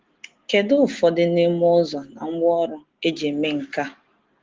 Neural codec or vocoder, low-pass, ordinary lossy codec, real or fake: none; 7.2 kHz; Opus, 16 kbps; real